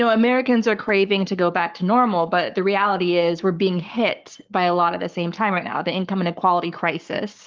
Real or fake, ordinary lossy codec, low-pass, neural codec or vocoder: fake; Opus, 32 kbps; 7.2 kHz; codec, 44.1 kHz, 7.8 kbps, Pupu-Codec